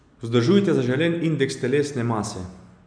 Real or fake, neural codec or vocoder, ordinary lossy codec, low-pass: real; none; none; 9.9 kHz